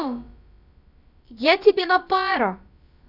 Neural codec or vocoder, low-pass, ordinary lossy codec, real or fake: codec, 16 kHz, about 1 kbps, DyCAST, with the encoder's durations; 5.4 kHz; none; fake